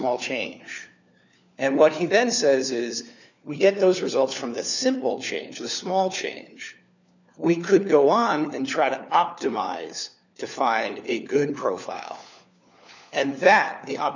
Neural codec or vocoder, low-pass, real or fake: codec, 16 kHz, 4 kbps, FunCodec, trained on LibriTTS, 50 frames a second; 7.2 kHz; fake